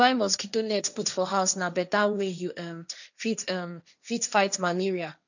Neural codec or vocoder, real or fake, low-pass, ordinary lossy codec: codec, 16 kHz, 1.1 kbps, Voila-Tokenizer; fake; 7.2 kHz; none